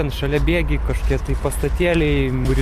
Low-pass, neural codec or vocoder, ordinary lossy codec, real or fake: 14.4 kHz; none; Opus, 64 kbps; real